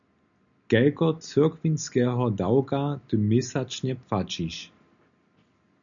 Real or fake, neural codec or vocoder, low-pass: real; none; 7.2 kHz